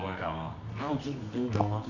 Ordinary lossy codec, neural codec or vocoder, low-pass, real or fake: none; codec, 24 kHz, 0.9 kbps, WavTokenizer, medium music audio release; 7.2 kHz; fake